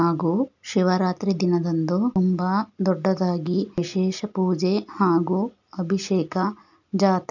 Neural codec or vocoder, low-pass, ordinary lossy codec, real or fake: none; 7.2 kHz; none; real